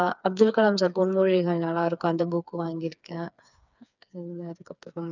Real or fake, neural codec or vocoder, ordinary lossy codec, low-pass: fake; codec, 16 kHz, 4 kbps, FreqCodec, smaller model; none; 7.2 kHz